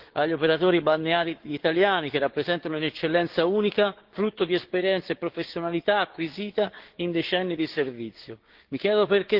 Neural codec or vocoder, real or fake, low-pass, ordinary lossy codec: codec, 16 kHz, 6 kbps, DAC; fake; 5.4 kHz; Opus, 16 kbps